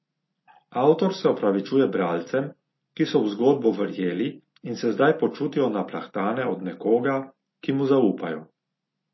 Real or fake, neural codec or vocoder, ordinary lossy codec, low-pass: real; none; MP3, 24 kbps; 7.2 kHz